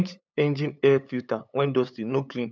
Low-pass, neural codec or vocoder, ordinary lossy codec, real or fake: none; codec, 16 kHz, 8 kbps, FunCodec, trained on LibriTTS, 25 frames a second; none; fake